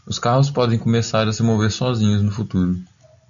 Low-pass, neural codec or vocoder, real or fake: 7.2 kHz; none; real